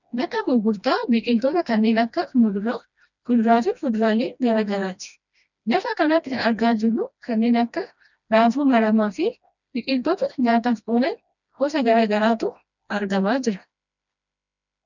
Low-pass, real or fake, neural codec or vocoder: 7.2 kHz; fake; codec, 16 kHz, 1 kbps, FreqCodec, smaller model